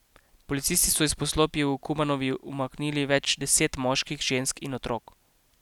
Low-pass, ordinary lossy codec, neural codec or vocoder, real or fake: 19.8 kHz; none; none; real